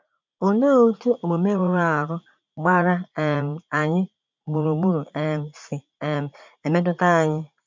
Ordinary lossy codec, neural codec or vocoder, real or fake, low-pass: MP3, 64 kbps; vocoder, 44.1 kHz, 80 mel bands, Vocos; fake; 7.2 kHz